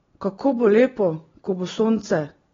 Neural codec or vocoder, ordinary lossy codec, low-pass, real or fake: none; AAC, 24 kbps; 7.2 kHz; real